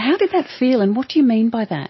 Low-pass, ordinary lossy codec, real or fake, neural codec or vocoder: 7.2 kHz; MP3, 24 kbps; real; none